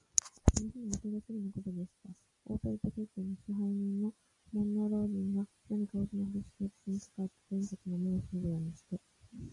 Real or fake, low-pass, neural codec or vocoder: real; 10.8 kHz; none